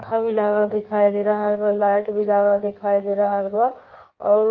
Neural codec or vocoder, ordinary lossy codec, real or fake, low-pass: codec, 16 kHz in and 24 kHz out, 1.1 kbps, FireRedTTS-2 codec; Opus, 32 kbps; fake; 7.2 kHz